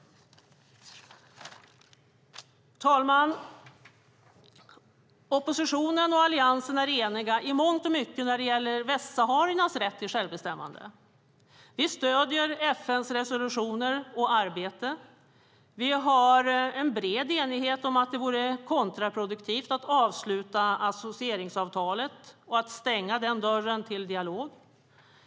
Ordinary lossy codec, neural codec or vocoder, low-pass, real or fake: none; none; none; real